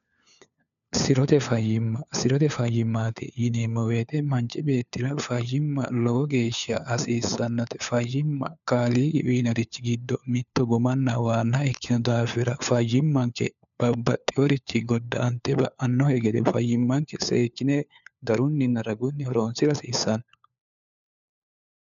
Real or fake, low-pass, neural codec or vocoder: fake; 7.2 kHz; codec, 16 kHz, 4 kbps, FunCodec, trained on LibriTTS, 50 frames a second